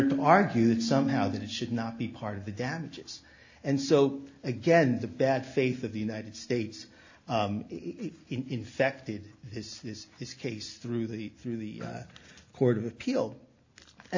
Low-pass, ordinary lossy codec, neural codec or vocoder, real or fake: 7.2 kHz; AAC, 48 kbps; none; real